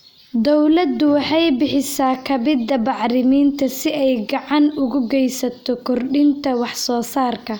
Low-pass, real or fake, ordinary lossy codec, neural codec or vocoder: none; real; none; none